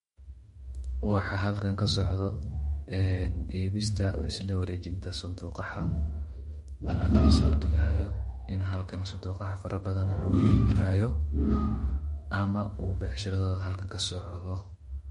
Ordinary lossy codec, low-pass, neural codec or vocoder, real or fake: MP3, 48 kbps; 19.8 kHz; autoencoder, 48 kHz, 32 numbers a frame, DAC-VAE, trained on Japanese speech; fake